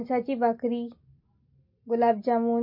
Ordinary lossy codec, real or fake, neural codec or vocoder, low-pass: MP3, 32 kbps; real; none; 5.4 kHz